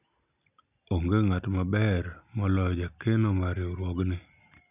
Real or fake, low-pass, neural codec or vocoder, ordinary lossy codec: real; 3.6 kHz; none; none